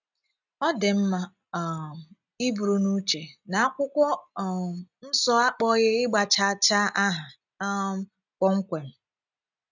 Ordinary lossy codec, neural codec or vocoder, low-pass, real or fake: none; none; 7.2 kHz; real